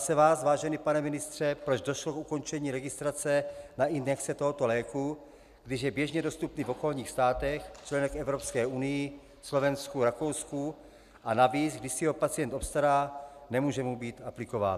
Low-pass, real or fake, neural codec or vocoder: 14.4 kHz; real; none